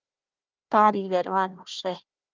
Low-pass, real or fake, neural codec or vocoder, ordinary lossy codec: 7.2 kHz; fake; codec, 16 kHz, 1 kbps, FunCodec, trained on Chinese and English, 50 frames a second; Opus, 24 kbps